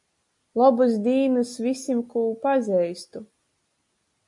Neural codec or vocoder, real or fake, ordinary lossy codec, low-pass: none; real; AAC, 64 kbps; 10.8 kHz